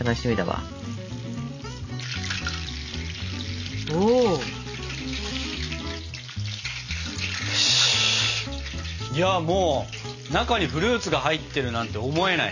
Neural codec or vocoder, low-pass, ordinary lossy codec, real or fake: none; 7.2 kHz; none; real